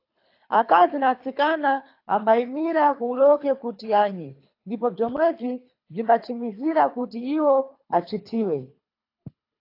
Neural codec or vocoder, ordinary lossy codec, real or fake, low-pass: codec, 24 kHz, 3 kbps, HILCodec; AAC, 32 kbps; fake; 5.4 kHz